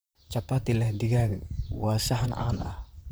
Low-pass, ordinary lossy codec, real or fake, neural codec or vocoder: none; none; fake; vocoder, 44.1 kHz, 128 mel bands, Pupu-Vocoder